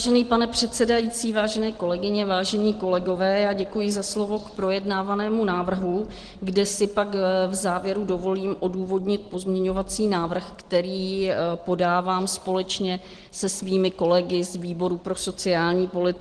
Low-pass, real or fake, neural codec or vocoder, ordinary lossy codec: 9.9 kHz; real; none; Opus, 16 kbps